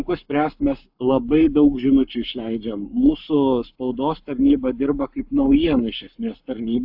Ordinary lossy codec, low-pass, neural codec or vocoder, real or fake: AAC, 48 kbps; 5.4 kHz; codec, 44.1 kHz, 7.8 kbps, Pupu-Codec; fake